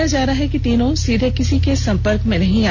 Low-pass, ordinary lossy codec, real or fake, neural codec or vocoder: 7.2 kHz; none; real; none